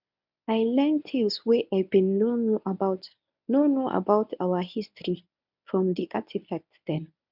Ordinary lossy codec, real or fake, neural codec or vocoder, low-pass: none; fake; codec, 24 kHz, 0.9 kbps, WavTokenizer, medium speech release version 1; 5.4 kHz